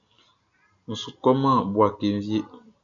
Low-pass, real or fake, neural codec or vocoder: 7.2 kHz; real; none